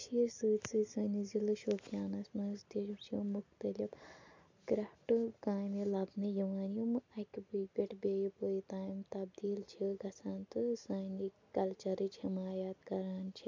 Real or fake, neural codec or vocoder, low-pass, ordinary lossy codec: real; none; 7.2 kHz; MP3, 64 kbps